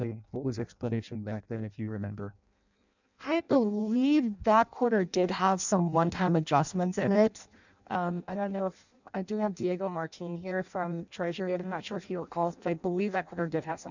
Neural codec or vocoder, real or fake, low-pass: codec, 16 kHz in and 24 kHz out, 0.6 kbps, FireRedTTS-2 codec; fake; 7.2 kHz